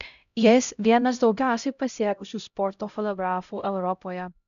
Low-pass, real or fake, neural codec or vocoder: 7.2 kHz; fake; codec, 16 kHz, 0.5 kbps, X-Codec, HuBERT features, trained on LibriSpeech